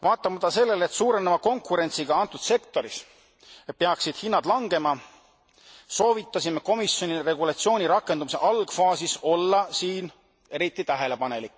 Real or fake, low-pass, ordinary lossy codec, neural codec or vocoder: real; none; none; none